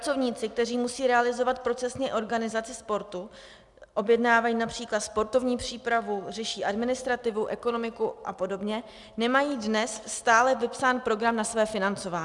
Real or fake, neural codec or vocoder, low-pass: real; none; 10.8 kHz